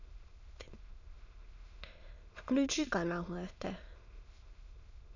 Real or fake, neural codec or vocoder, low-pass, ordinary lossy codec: fake; autoencoder, 22.05 kHz, a latent of 192 numbers a frame, VITS, trained on many speakers; 7.2 kHz; none